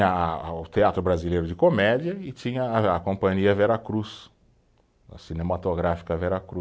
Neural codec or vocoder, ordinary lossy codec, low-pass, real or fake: none; none; none; real